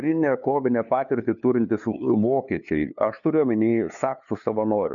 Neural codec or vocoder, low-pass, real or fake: codec, 16 kHz, 2 kbps, FunCodec, trained on LibriTTS, 25 frames a second; 7.2 kHz; fake